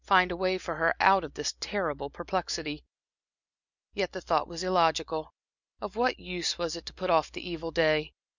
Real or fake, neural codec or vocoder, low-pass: real; none; 7.2 kHz